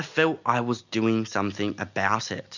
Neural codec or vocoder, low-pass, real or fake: none; 7.2 kHz; real